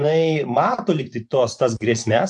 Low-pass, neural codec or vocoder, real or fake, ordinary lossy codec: 10.8 kHz; vocoder, 24 kHz, 100 mel bands, Vocos; fake; AAC, 64 kbps